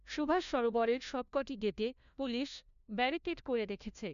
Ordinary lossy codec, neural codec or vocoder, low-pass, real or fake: MP3, 96 kbps; codec, 16 kHz, 1 kbps, FunCodec, trained on LibriTTS, 50 frames a second; 7.2 kHz; fake